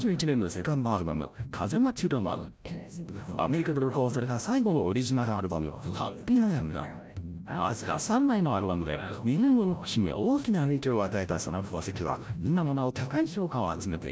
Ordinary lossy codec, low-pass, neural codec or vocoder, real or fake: none; none; codec, 16 kHz, 0.5 kbps, FreqCodec, larger model; fake